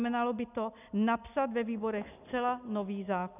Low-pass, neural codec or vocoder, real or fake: 3.6 kHz; none; real